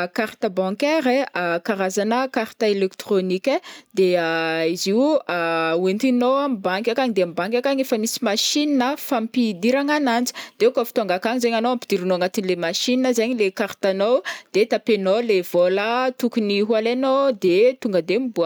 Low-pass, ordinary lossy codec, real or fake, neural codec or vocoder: none; none; real; none